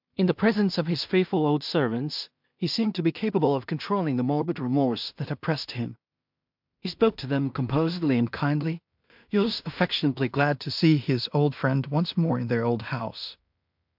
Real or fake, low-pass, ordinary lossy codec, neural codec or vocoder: fake; 5.4 kHz; MP3, 48 kbps; codec, 16 kHz in and 24 kHz out, 0.4 kbps, LongCat-Audio-Codec, two codebook decoder